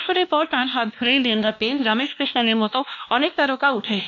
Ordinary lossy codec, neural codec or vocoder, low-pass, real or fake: none; codec, 16 kHz, 1 kbps, X-Codec, WavLM features, trained on Multilingual LibriSpeech; 7.2 kHz; fake